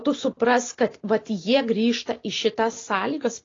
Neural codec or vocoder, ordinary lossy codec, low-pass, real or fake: none; AAC, 32 kbps; 7.2 kHz; real